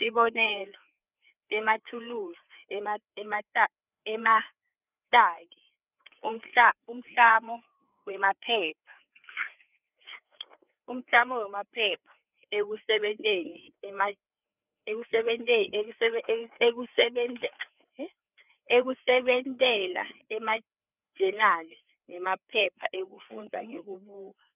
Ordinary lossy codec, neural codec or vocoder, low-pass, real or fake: none; codec, 16 kHz, 4 kbps, FreqCodec, larger model; 3.6 kHz; fake